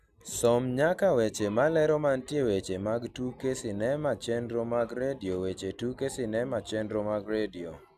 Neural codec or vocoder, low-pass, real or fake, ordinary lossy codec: none; 14.4 kHz; real; none